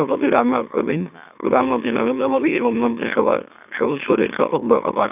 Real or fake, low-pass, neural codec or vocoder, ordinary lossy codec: fake; 3.6 kHz; autoencoder, 44.1 kHz, a latent of 192 numbers a frame, MeloTTS; none